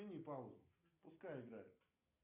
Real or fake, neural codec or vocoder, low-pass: real; none; 3.6 kHz